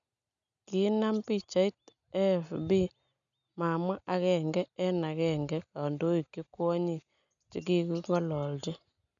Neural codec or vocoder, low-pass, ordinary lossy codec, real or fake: none; 7.2 kHz; none; real